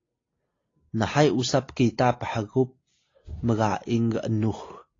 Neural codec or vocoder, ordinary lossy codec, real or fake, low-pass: none; AAC, 32 kbps; real; 7.2 kHz